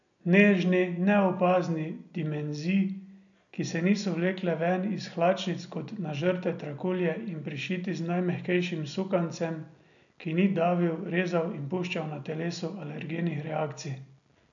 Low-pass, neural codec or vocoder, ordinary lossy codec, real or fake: 7.2 kHz; none; none; real